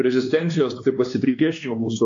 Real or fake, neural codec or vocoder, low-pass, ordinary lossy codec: fake; codec, 16 kHz, 2 kbps, X-Codec, WavLM features, trained on Multilingual LibriSpeech; 7.2 kHz; AAC, 48 kbps